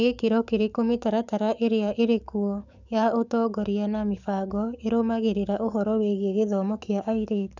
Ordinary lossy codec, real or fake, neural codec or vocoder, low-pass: none; fake; codec, 44.1 kHz, 7.8 kbps, DAC; 7.2 kHz